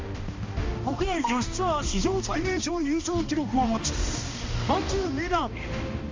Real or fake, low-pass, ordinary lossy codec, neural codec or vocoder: fake; 7.2 kHz; MP3, 64 kbps; codec, 16 kHz, 1 kbps, X-Codec, HuBERT features, trained on balanced general audio